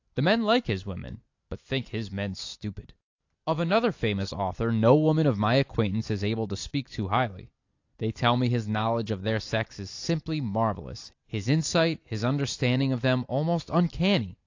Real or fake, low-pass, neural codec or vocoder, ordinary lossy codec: real; 7.2 kHz; none; AAC, 48 kbps